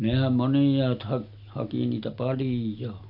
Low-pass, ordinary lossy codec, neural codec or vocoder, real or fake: 5.4 kHz; none; none; real